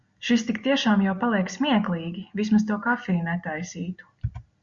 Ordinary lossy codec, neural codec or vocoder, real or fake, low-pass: Opus, 64 kbps; none; real; 7.2 kHz